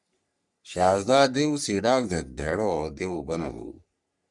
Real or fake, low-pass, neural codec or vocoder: fake; 10.8 kHz; codec, 44.1 kHz, 3.4 kbps, Pupu-Codec